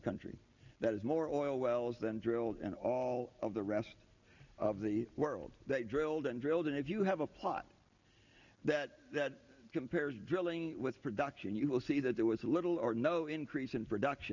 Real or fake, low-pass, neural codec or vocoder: real; 7.2 kHz; none